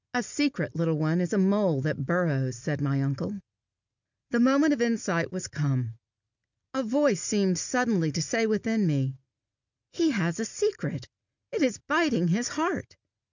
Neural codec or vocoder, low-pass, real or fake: none; 7.2 kHz; real